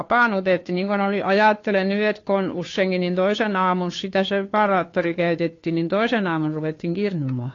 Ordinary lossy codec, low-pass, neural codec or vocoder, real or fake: AAC, 32 kbps; 7.2 kHz; codec, 16 kHz, 2 kbps, FunCodec, trained on Chinese and English, 25 frames a second; fake